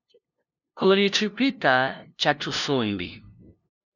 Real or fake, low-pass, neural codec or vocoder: fake; 7.2 kHz; codec, 16 kHz, 0.5 kbps, FunCodec, trained on LibriTTS, 25 frames a second